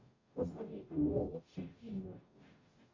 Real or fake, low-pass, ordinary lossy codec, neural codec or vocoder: fake; 7.2 kHz; AAC, 32 kbps; codec, 44.1 kHz, 0.9 kbps, DAC